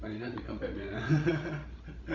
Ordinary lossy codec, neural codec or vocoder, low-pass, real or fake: AAC, 48 kbps; codec, 16 kHz, 16 kbps, FreqCodec, larger model; 7.2 kHz; fake